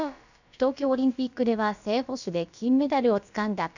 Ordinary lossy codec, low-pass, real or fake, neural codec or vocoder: none; 7.2 kHz; fake; codec, 16 kHz, about 1 kbps, DyCAST, with the encoder's durations